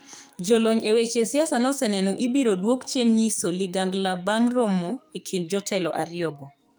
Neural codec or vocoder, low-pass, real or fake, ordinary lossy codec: codec, 44.1 kHz, 2.6 kbps, SNAC; none; fake; none